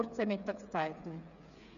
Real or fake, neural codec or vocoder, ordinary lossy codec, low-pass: fake; codec, 16 kHz, 8 kbps, FreqCodec, smaller model; none; 7.2 kHz